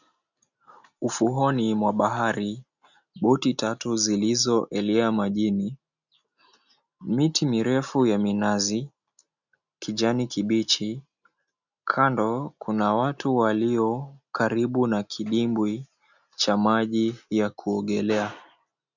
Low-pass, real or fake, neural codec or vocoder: 7.2 kHz; real; none